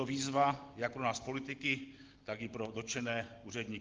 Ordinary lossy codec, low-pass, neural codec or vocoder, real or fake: Opus, 16 kbps; 7.2 kHz; none; real